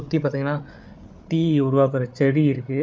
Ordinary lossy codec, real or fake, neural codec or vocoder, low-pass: none; fake; codec, 16 kHz, 8 kbps, FreqCodec, larger model; none